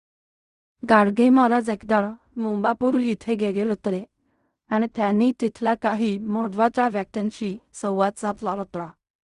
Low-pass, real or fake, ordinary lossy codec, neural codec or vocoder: 10.8 kHz; fake; Opus, 64 kbps; codec, 16 kHz in and 24 kHz out, 0.4 kbps, LongCat-Audio-Codec, fine tuned four codebook decoder